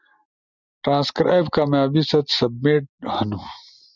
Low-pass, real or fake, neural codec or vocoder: 7.2 kHz; real; none